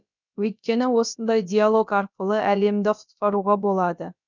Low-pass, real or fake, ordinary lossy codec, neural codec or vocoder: 7.2 kHz; fake; none; codec, 16 kHz, about 1 kbps, DyCAST, with the encoder's durations